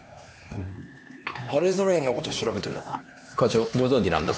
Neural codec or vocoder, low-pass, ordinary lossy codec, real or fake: codec, 16 kHz, 2 kbps, X-Codec, HuBERT features, trained on LibriSpeech; none; none; fake